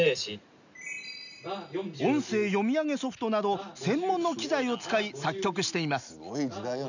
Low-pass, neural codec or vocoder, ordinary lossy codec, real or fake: 7.2 kHz; none; none; real